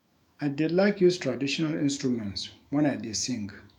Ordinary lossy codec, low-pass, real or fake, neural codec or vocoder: none; 19.8 kHz; fake; autoencoder, 48 kHz, 128 numbers a frame, DAC-VAE, trained on Japanese speech